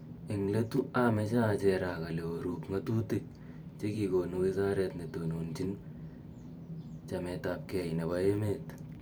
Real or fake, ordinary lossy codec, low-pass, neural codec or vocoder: fake; none; none; vocoder, 44.1 kHz, 128 mel bands every 512 samples, BigVGAN v2